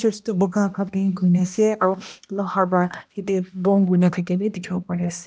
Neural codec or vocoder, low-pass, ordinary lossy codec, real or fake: codec, 16 kHz, 1 kbps, X-Codec, HuBERT features, trained on balanced general audio; none; none; fake